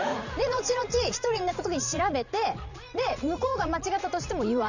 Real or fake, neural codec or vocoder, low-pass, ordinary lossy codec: fake; vocoder, 44.1 kHz, 80 mel bands, Vocos; 7.2 kHz; none